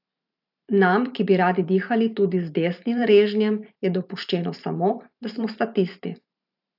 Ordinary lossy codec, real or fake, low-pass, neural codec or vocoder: AAC, 48 kbps; fake; 5.4 kHz; vocoder, 44.1 kHz, 128 mel bands every 512 samples, BigVGAN v2